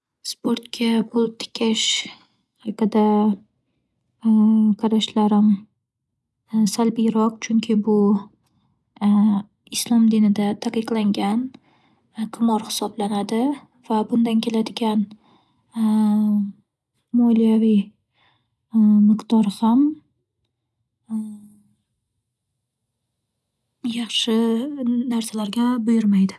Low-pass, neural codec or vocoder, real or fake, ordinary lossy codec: none; none; real; none